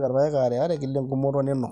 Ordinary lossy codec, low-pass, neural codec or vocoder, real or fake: none; none; none; real